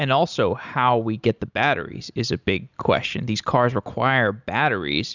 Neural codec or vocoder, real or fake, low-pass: none; real; 7.2 kHz